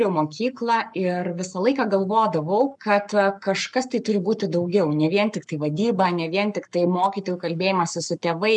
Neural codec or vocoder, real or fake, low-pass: codec, 44.1 kHz, 7.8 kbps, Pupu-Codec; fake; 10.8 kHz